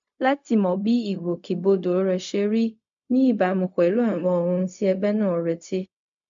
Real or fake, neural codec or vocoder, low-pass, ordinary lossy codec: fake; codec, 16 kHz, 0.4 kbps, LongCat-Audio-Codec; 7.2 kHz; MP3, 64 kbps